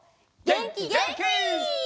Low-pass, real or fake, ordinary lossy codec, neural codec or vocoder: none; real; none; none